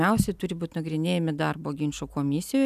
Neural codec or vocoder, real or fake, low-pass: none; real; 14.4 kHz